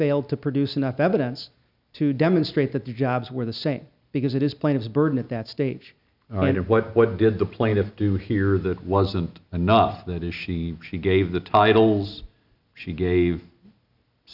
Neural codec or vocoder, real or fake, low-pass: none; real; 5.4 kHz